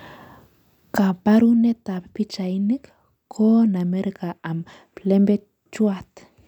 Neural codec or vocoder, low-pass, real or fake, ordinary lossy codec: none; 19.8 kHz; real; none